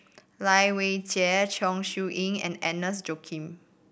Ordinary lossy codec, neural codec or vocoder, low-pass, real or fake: none; none; none; real